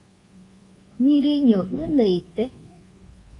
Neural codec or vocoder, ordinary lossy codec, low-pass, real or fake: codec, 24 kHz, 1.2 kbps, DualCodec; AAC, 32 kbps; 10.8 kHz; fake